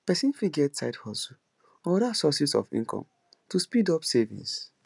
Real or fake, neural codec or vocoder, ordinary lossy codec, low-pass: real; none; none; 10.8 kHz